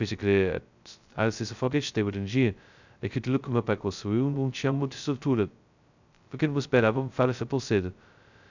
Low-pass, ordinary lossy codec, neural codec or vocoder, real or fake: 7.2 kHz; none; codec, 16 kHz, 0.2 kbps, FocalCodec; fake